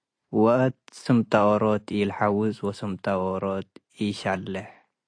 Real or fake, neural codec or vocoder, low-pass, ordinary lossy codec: real; none; 9.9 kHz; AAC, 48 kbps